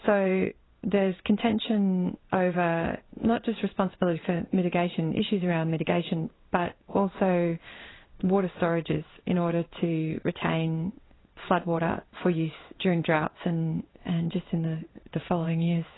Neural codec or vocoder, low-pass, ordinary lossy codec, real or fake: codec, 16 kHz in and 24 kHz out, 1 kbps, XY-Tokenizer; 7.2 kHz; AAC, 16 kbps; fake